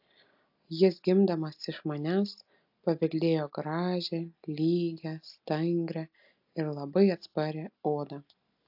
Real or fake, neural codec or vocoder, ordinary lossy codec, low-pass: real; none; AAC, 48 kbps; 5.4 kHz